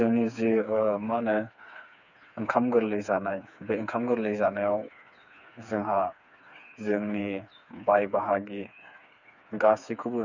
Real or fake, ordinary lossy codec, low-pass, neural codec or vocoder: fake; none; 7.2 kHz; codec, 16 kHz, 4 kbps, FreqCodec, smaller model